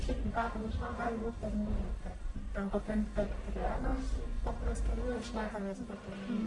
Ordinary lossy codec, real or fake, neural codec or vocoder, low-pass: MP3, 64 kbps; fake; codec, 44.1 kHz, 1.7 kbps, Pupu-Codec; 10.8 kHz